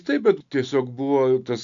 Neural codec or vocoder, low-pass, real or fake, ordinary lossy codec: none; 7.2 kHz; real; MP3, 64 kbps